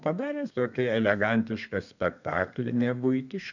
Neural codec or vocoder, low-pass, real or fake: codec, 32 kHz, 1.9 kbps, SNAC; 7.2 kHz; fake